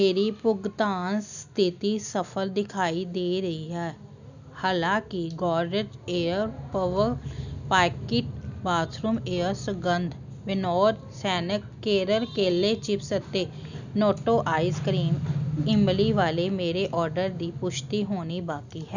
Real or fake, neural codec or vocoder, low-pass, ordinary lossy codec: real; none; 7.2 kHz; none